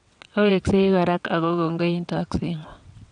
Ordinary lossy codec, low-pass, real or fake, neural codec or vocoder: none; 9.9 kHz; fake; vocoder, 22.05 kHz, 80 mel bands, WaveNeXt